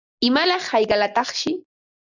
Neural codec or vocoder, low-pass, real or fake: none; 7.2 kHz; real